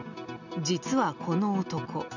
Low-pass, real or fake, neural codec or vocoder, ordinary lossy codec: 7.2 kHz; real; none; none